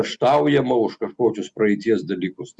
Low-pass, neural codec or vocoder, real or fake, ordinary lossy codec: 10.8 kHz; none; real; Opus, 64 kbps